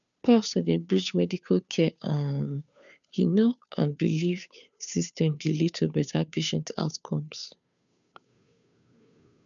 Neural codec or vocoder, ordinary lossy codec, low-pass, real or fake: codec, 16 kHz, 2 kbps, FunCodec, trained on Chinese and English, 25 frames a second; none; 7.2 kHz; fake